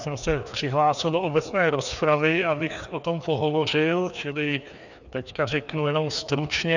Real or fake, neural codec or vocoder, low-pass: fake; codec, 16 kHz, 2 kbps, FreqCodec, larger model; 7.2 kHz